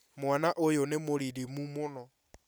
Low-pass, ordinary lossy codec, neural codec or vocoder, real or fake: none; none; none; real